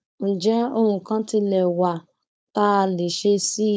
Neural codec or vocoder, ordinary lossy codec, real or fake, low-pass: codec, 16 kHz, 4.8 kbps, FACodec; none; fake; none